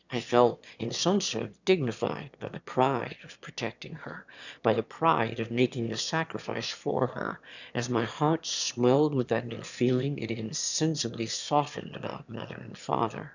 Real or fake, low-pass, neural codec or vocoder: fake; 7.2 kHz; autoencoder, 22.05 kHz, a latent of 192 numbers a frame, VITS, trained on one speaker